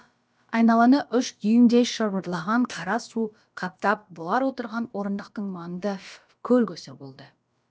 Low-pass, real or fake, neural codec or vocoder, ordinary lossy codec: none; fake; codec, 16 kHz, about 1 kbps, DyCAST, with the encoder's durations; none